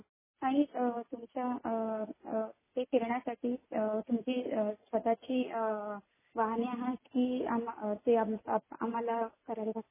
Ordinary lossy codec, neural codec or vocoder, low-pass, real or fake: MP3, 16 kbps; none; 3.6 kHz; real